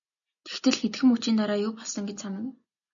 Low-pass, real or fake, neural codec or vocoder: 7.2 kHz; real; none